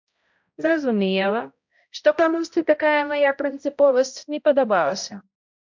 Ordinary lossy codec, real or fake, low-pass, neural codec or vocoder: MP3, 64 kbps; fake; 7.2 kHz; codec, 16 kHz, 0.5 kbps, X-Codec, HuBERT features, trained on balanced general audio